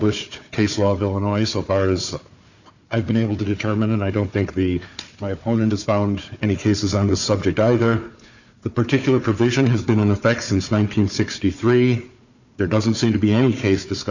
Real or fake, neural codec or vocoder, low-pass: fake; codec, 16 kHz, 4 kbps, FunCodec, trained on Chinese and English, 50 frames a second; 7.2 kHz